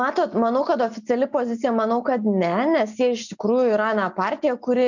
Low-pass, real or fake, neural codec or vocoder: 7.2 kHz; real; none